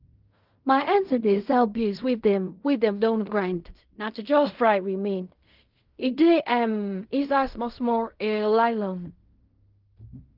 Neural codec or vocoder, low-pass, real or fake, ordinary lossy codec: codec, 16 kHz in and 24 kHz out, 0.4 kbps, LongCat-Audio-Codec, fine tuned four codebook decoder; 5.4 kHz; fake; Opus, 24 kbps